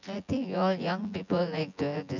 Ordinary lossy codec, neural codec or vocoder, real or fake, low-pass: none; vocoder, 24 kHz, 100 mel bands, Vocos; fake; 7.2 kHz